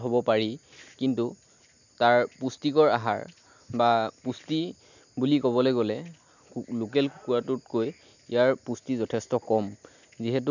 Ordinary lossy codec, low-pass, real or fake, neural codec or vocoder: none; 7.2 kHz; real; none